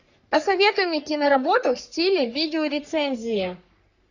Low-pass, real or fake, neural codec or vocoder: 7.2 kHz; fake; codec, 44.1 kHz, 3.4 kbps, Pupu-Codec